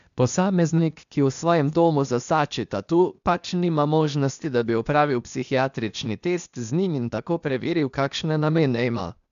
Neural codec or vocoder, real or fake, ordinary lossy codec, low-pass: codec, 16 kHz, 0.8 kbps, ZipCodec; fake; none; 7.2 kHz